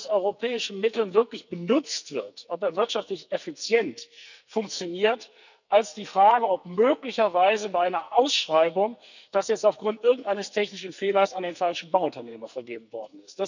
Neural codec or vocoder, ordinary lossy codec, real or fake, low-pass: codec, 44.1 kHz, 2.6 kbps, SNAC; none; fake; 7.2 kHz